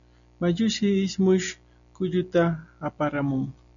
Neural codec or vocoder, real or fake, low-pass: none; real; 7.2 kHz